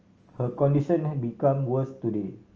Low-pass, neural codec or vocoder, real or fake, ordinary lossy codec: 7.2 kHz; none; real; Opus, 24 kbps